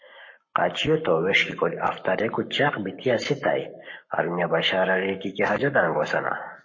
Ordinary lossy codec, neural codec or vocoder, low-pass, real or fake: MP3, 32 kbps; codec, 44.1 kHz, 7.8 kbps, Pupu-Codec; 7.2 kHz; fake